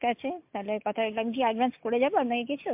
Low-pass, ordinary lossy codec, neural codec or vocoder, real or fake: 3.6 kHz; MP3, 32 kbps; none; real